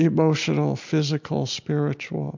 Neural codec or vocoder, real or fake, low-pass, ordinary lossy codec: none; real; 7.2 kHz; MP3, 64 kbps